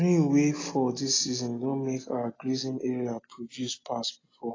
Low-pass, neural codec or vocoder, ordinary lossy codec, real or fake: 7.2 kHz; none; AAC, 32 kbps; real